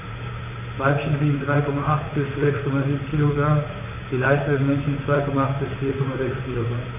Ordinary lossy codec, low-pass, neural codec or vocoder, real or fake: none; 3.6 kHz; vocoder, 44.1 kHz, 128 mel bands, Pupu-Vocoder; fake